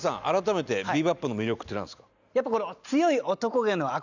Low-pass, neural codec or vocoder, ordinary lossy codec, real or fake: 7.2 kHz; none; none; real